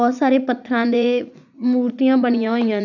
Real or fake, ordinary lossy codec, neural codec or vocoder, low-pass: fake; none; vocoder, 44.1 kHz, 80 mel bands, Vocos; 7.2 kHz